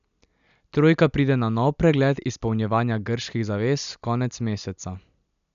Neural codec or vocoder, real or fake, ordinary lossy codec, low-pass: none; real; none; 7.2 kHz